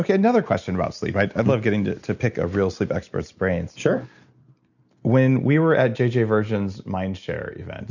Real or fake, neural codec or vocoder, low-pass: real; none; 7.2 kHz